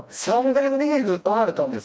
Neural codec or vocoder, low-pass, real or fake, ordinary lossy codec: codec, 16 kHz, 1 kbps, FreqCodec, smaller model; none; fake; none